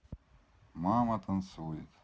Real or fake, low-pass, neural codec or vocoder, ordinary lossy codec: real; none; none; none